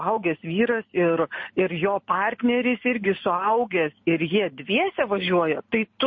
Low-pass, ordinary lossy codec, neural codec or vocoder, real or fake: 7.2 kHz; MP3, 32 kbps; none; real